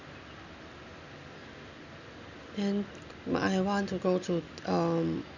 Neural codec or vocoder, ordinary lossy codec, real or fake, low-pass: none; none; real; 7.2 kHz